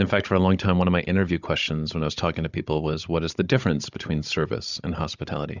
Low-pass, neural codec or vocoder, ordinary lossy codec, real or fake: 7.2 kHz; codec, 16 kHz, 16 kbps, FunCodec, trained on Chinese and English, 50 frames a second; Opus, 64 kbps; fake